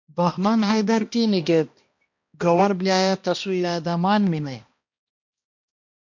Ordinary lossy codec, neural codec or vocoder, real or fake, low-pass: MP3, 48 kbps; codec, 16 kHz, 1 kbps, X-Codec, HuBERT features, trained on balanced general audio; fake; 7.2 kHz